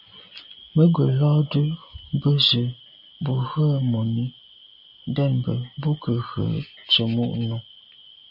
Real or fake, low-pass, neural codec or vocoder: real; 5.4 kHz; none